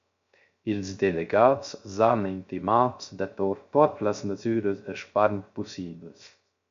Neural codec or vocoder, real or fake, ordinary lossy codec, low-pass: codec, 16 kHz, 0.3 kbps, FocalCodec; fake; MP3, 64 kbps; 7.2 kHz